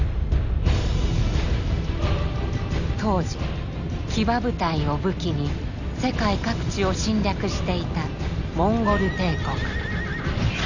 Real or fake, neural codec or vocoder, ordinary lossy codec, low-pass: real; none; none; 7.2 kHz